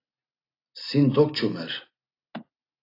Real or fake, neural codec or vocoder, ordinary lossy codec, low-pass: real; none; AAC, 32 kbps; 5.4 kHz